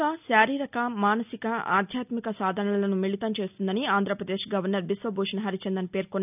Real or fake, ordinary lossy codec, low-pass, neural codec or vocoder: real; none; 3.6 kHz; none